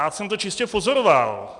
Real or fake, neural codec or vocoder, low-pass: real; none; 10.8 kHz